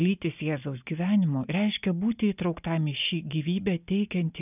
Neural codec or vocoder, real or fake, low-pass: none; real; 3.6 kHz